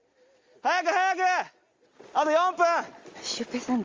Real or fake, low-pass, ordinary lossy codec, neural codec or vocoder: real; 7.2 kHz; Opus, 32 kbps; none